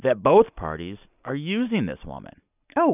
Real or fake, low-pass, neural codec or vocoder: real; 3.6 kHz; none